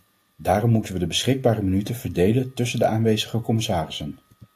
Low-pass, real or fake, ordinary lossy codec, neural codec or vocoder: 14.4 kHz; real; AAC, 64 kbps; none